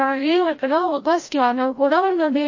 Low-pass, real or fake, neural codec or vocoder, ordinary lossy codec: 7.2 kHz; fake; codec, 16 kHz, 0.5 kbps, FreqCodec, larger model; MP3, 32 kbps